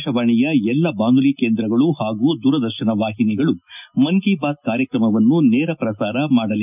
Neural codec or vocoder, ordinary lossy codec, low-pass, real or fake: none; none; 3.6 kHz; real